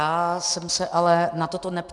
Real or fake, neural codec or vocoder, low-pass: real; none; 10.8 kHz